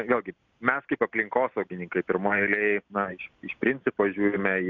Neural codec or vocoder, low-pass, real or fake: none; 7.2 kHz; real